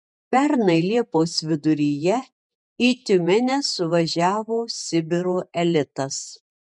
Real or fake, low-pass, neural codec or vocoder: fake; 10.8 kHz; vocoder, 48 kHz, 128 mel bands, Vocos